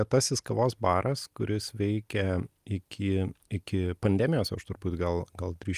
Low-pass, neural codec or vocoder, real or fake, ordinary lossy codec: 14.4 kHz; none; real; Opus, 32 kbps